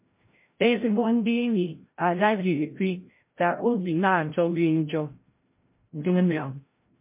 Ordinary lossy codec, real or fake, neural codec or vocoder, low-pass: MP3, 24 kbps; fake; codec, 16 kHz, 0.5 kbps, FreqCodec, larger model; 3.6 kHz